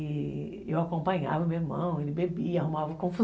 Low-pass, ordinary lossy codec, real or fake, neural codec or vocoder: none; none; real; none